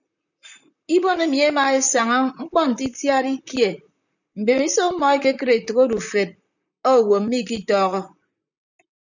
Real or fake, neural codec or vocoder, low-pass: fake; vocoder, 44.1 kHz, 128 mel bands, Pupu-Vocoder; 7.2 kHz